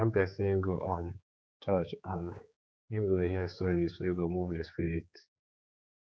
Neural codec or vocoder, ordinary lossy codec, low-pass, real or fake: codec, 16 kHz, 4 kbps, X-Codec, HuBERT features, trained on general audio; none; none; fake